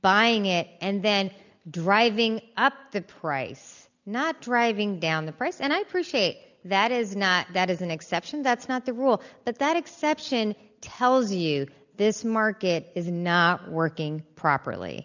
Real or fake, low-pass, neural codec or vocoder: real; 7.2 kHz; none